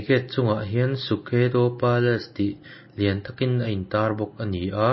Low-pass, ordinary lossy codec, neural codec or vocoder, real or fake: 7.2 kHz; MP3, 24 kbps; none; real